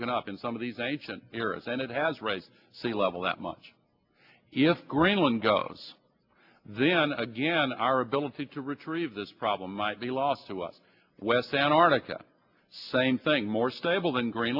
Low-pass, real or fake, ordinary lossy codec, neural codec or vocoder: 5.4 kHz; real; AAC, 48 kbps; none